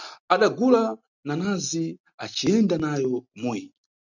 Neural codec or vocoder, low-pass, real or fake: none; 7.2 kHz; real